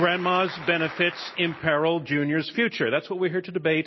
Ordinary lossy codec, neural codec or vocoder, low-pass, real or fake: MP3, 24 kbps; none; 7.2 kHz; real